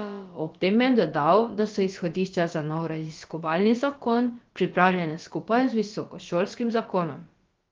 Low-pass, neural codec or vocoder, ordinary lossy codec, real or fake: 7.2 kHz; codec, 16 kHz, about 1 kbps, DyCAST, with the encoder's durations; Opus, 32 kbps; fake